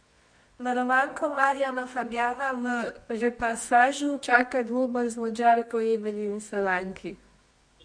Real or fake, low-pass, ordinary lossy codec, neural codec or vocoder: fake; 9.9 kHz; MP3, 48 kbps; codec, 24 kHz, 0.9 kbps, WavTokenizer, medium music audio release